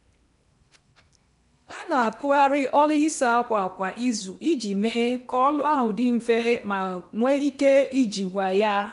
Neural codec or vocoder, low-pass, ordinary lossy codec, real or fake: codec, 16 kHz in and 24 kHz out, 0.8 kbps, FocalCodec, streaming, 65536 codes; 10.8 kHz; none; fake